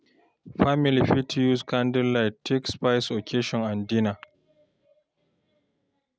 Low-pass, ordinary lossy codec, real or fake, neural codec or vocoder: none; none; real; none